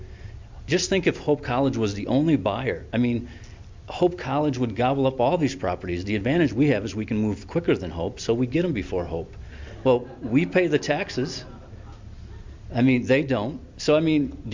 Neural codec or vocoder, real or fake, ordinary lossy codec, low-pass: none; real; MP3, 64 kbps; 7.2 kHz